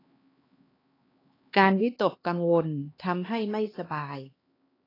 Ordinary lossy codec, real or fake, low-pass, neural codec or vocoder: AAC, 24 kbps; fake; 5.4 kHz; codec, 16 kHz, 2 kbps, X-Codec, HuBERT features, trained on LibriSpeech